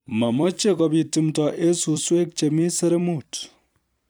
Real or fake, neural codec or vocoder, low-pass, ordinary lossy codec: fake; vocoder, 44.1 kHz, 128 mel bands every 256 samples, BigVGAN v2; none; none